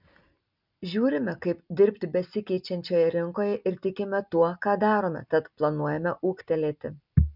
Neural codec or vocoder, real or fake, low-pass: none; real; 5.4 kHz